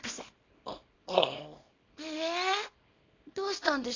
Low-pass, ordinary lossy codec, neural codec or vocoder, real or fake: 7.2 kHz; AAC, 32 kbps; codec, 24 kHz, 0.9 kbps, WavTokenizer, small release; fake